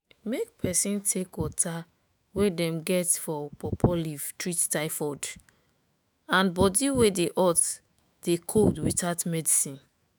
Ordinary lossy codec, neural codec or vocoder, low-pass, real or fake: none; autoencoder, 48 kHz, 128 numbers a frame, DAC-VAE, trained on Japanese speech; none; fake